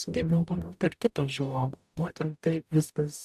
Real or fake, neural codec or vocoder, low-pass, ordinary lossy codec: fake; codec, 44.1 kHz, 0.9 kbps, DAC; 14.4 kHz; AAC, 96 kbps